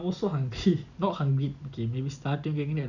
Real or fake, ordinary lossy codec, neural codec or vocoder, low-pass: real; none; none; 7.2 kHz